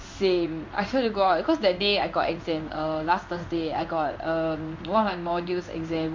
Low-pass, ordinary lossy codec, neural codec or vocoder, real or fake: 7.2 kHz; MP3, 48 kbps; codec, 16 kHz in and 24 kHz out, 1 kbps, XY-Tokenizer; fake